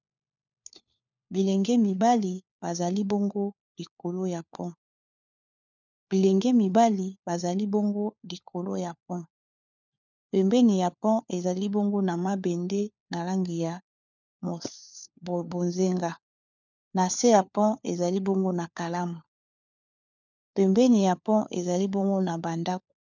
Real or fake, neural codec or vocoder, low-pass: fake; codec, 16 kHz, 4 kbps, FunCodec, trained on LibriTTS, 50 frames a second; 7.2 kHz